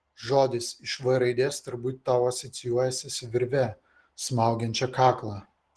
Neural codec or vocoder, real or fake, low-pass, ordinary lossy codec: none; real; 10.8 kHz; Opus, 16 kbps